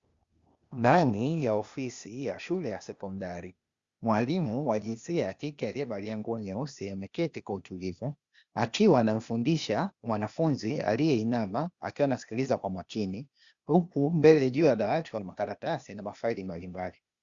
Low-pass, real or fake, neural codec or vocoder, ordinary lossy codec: 7.2 kHz; fake; codec, 16 kHz, 0.8 kbps, ZipCodec; Opus, 64 kbps